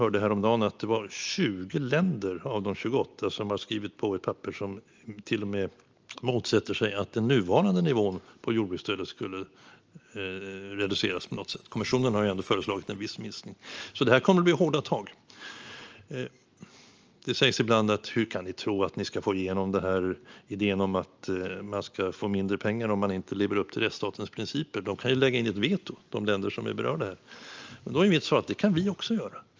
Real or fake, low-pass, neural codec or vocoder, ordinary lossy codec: real; 7.2 kHz; none; Opus, 24 kbps